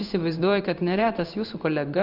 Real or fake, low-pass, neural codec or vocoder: fake; 5.4 kHz; codec, 16 kHz in and 24 kHz out, 1 kbps, XY-Tokenizer